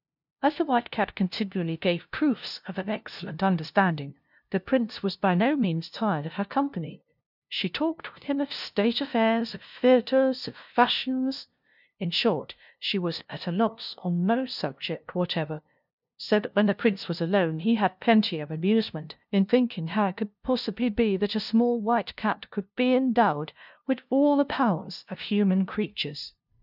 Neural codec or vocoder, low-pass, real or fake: codec, 16 kHz, 0.5 kbps, FunCodec, trained on LibriTTS, 25 frames a second; 5.4 kHz; fake